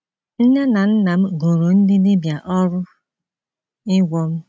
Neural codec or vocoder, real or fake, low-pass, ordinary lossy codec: none; real; none; none